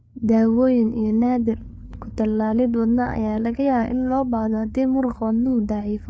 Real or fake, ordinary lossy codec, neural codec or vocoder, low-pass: fake; none; codec, 16 kHz, 4 kbps, FreqCodec, larger model; none